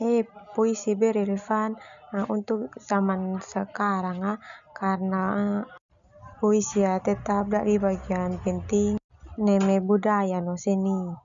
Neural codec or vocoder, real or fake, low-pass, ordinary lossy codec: none; real; 7.2 kHz; none